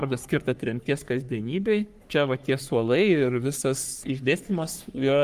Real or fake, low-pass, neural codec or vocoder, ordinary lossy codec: fake; 14.4 kHz; codec, 44.1 kHz, 3.4 kbps, Pupu-Codec; Opus, 32 kbps